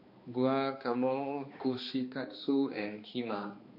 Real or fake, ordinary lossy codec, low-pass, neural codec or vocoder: fake; MP3, 32 kbps; 5.4 kHz; codec, 16 kHz, 2 kbps, X-Codec, HuBERT features, trained on balanced general audio